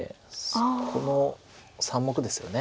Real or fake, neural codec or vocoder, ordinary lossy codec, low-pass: real; none; none; none